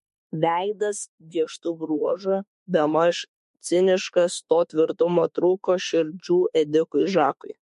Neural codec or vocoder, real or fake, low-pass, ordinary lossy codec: autoencoder, 48 kHz, 32 numbers a frame, DAC-VAE, trained on Japanese speech; fake; 14.4 kHz; MP3, 48 kbps